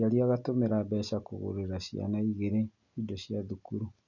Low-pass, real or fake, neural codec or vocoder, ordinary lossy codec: 7.2 kHz; real; none; none